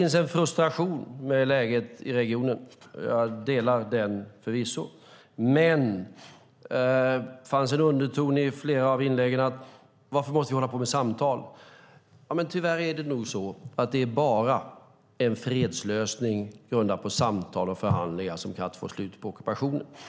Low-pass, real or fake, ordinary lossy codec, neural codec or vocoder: none; real; none; none